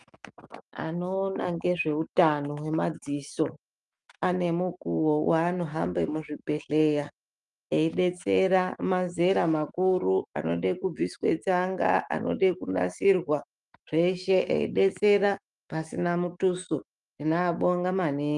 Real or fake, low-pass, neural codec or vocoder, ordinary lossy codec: fake; 10.8 kHz; autoencoder, 48 kHz, 128 numbers a frame, DAC-VAE, trained on Japanese speech; Opus, 32 kbps